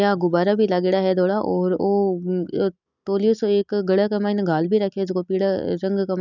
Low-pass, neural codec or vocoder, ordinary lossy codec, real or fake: 7.2 kHz; none; none; real